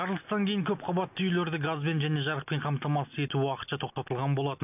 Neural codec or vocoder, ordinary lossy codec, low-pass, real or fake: none; none; 3.6 kHz; real